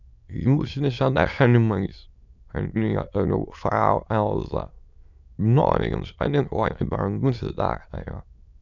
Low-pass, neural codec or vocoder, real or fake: 7.2 kHz; autoencoder, 22.05 kHz, a latent of 192 numbers a frame, VITS, trained on many speakers; fake